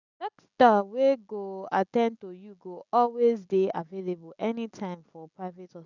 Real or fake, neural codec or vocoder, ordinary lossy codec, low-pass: real; none; none; 7.2 kHz